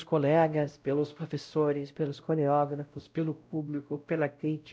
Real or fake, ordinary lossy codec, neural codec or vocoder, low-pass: fake; none; codec, 16 kHz, 0.5 kbps, X-Codec, WavLM features, trained on Multilingual LibriSpeech; none